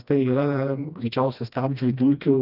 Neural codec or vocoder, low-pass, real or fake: codec, 16 kHz, 1 kbps, FreqCodec, smaller model; 5.4 kHz; fake